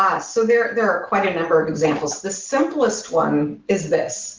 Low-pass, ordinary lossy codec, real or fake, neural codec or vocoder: 7.2 kHz; Opus, 16 kbps; real; none